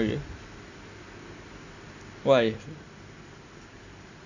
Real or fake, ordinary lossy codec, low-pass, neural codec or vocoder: fake; none; 7.2 kHz; vocoder, 44.1 kHz, 128 mel bands every 256 samples, BigVGAN v2